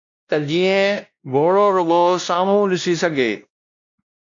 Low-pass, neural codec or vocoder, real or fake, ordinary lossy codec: 7.2 kHz; codec, 16 kHz, 1 kbps, X-Codec, WavLM features, trained on Multilingual LibriSpeech; fake; AAC, 64 kbps